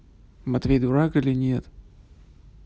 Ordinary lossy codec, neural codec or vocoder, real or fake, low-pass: none; none; real; none